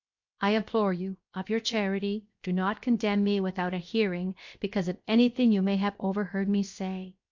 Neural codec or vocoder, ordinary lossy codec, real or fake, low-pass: codec, 16 kHz, 0.7 kbps, FocalCodec; MP3, 48 kbps; fake; 7.2 kHz